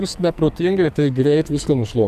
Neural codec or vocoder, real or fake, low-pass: codec, 44.1 kHz, 2.6 kbps, SNAC; fake; 14.4 kHz